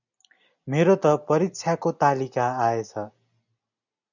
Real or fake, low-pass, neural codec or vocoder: real; 7.2 kHz; none